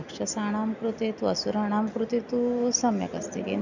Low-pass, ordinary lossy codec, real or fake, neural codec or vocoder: 7.2 kHz; none; real; none